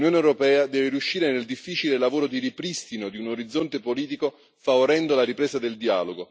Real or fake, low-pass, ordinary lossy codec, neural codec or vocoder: real; none; none; none